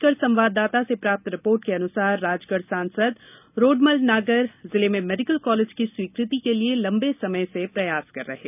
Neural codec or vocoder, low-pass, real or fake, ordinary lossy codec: none; 3.6 kHz; real; none